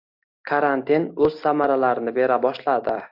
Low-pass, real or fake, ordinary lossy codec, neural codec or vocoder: 5.4 kHz; real; AAC, 48 kbps; none